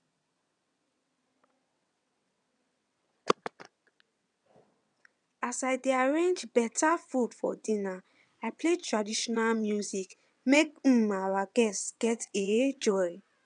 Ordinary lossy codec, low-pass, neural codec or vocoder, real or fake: none; 9.9 kHz; vocoder, 22.05 kHz, 80 mel bands, WaveNeXt; fake